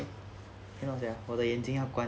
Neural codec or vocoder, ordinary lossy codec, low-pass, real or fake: none; none; none; real